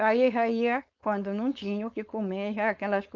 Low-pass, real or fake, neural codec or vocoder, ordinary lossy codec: 7.2 kHz; fake; codec, 16 kHz, 4.8 kbps, FACodec; Opus, 24 kbps